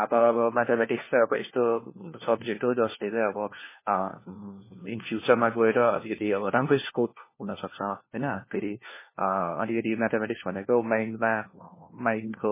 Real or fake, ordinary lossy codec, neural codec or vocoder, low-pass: fake; MP3, 16 kbps; codec, 16 kHz, 1 kbps, FunCodec, trained on LibriTTS, 50 frames a second; 3.6 kHz